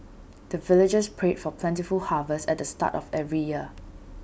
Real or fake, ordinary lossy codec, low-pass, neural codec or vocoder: real; none; none; none